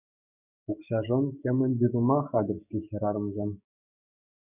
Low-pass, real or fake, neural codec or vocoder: 3.6 kHz; fake; codec, 16 kHz, 6 kbps, DAC